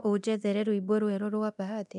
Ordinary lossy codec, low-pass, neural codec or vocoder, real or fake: none; 10.8 kHz; codec, 24 kHz, 0.9 kbps, DualCodec; fake